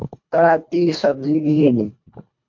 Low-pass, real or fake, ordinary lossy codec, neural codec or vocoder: 7.2 kHz; fake; MP3, 48 kbps; codec, 24 kHz, 1.5 kbps, HILCodec